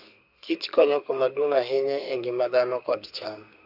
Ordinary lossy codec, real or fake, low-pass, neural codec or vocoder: Opus, 64 kbps; fake; 5.4 kHz; codec, 32 kHz, 1.9 kbps, SNAC